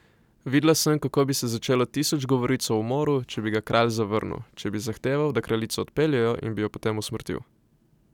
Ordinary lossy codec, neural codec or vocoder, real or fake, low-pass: none; none; real; 19.8 kHz